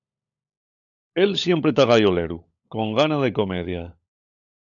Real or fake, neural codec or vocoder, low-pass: fake; codec, 16 kHz, 16 kbps, FunCodec, trained on LibriTTS, 50 frames a second; 7.2 kHz